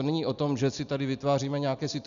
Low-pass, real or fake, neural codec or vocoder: 7.2 kHz; real; none